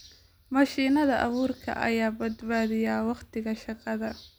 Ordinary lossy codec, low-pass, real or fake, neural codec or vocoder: none; none; real; none